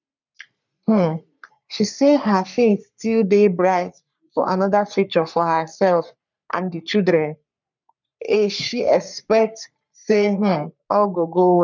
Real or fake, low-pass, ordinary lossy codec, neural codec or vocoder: fake; 7.2 kHz; none; codec, 44.1 kHz, 3.4 kbps, Pupu-Codec